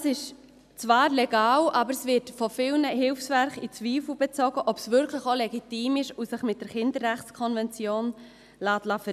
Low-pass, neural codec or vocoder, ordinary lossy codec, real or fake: 14.4 kHz; none; none; real